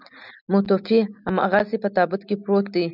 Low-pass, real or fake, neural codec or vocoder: 5.4 kHz; real; none